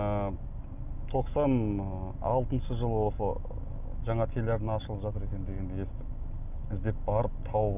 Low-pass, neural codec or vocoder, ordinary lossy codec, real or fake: 3.6 kHz; none; none; real